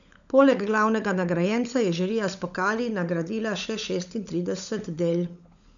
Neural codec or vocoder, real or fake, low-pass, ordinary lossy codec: codec, 16 kHz, 16 kbps, FunCodec, trained on LibriTTS, 50 frames a second; fake; 7.2 kHz; none